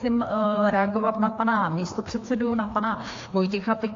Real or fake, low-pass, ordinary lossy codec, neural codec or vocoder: fake; 7.2 kHz; AAC, 48 kbps; codec, 16 kHz, 2 kbps, FreqCodec, larger model